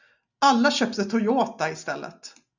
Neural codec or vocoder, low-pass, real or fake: none; 7.2 kHz; real